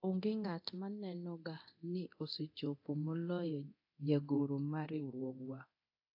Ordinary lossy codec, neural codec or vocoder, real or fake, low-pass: AAC, 48 kbps; codec, 24 kHz, 0.9 kbps, DualCodec; fake; 5.4 kHz